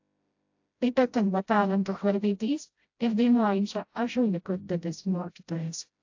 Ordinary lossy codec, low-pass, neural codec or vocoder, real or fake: AAC, 48 kbps; 7.2 kHz; codec, 16 kHz, 0.5 kbps, FreqCodec, smaller model; fake